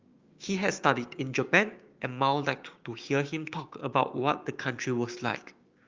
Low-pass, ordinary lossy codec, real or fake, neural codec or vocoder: 7.2 kHz; Opus, 32 kbps; fake; codec, 16 kHz, 6 kbps, DAC